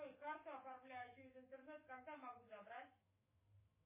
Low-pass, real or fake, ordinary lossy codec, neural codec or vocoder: 3.6 kHz; fake; MP3, 16 kbps; autoencoder, 48 kHz, 128 numbers a frame, DAC-VAE, trained on Japanese speech